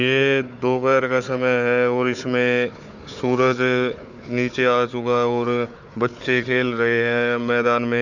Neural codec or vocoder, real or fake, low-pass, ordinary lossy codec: codec, 16 kHz, 4 kbps, FunCodec, trained on Chinese and English, 50 frames a second; fake; 7.2 kHz; none